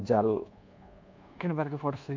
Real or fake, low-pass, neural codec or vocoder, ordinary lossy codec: fake; 7.2 kHz; codec, 24 kHz, 1.2 kbps, DualCodec; MP3, 48 kbps